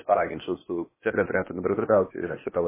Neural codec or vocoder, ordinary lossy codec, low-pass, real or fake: codec, 16 kHz, 0.8 kbps, ZipCodec; MP3, 16 kbps; 3.6 kHz; fake